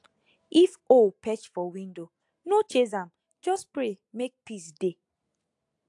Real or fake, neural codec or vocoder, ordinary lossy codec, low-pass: real; none; AAC, 64 kbps; 10.8 kHz